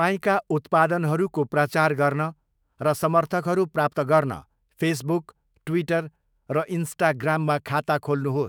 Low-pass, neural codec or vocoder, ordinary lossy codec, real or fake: none; autoencoder, 48 kHz, 128 numbers a frame, DAC-VAE, trained on Japanese speech; none; fake